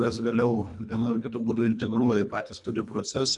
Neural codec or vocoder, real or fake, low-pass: codec, 24 kHz, 1.5 kbps, HILCodec; fake; 10.8 kHz